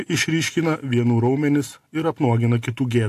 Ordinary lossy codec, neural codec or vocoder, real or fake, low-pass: AAC, 64 kbps; none; real; 14.4 kHz